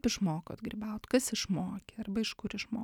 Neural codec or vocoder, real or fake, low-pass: none; real; 19.8 kHz